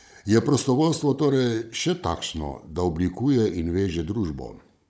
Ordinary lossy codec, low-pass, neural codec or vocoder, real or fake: none; none; codec, 16 kHz, 16 kbps, FunCodec, trained on Chinese and English, 50 frames a second; fake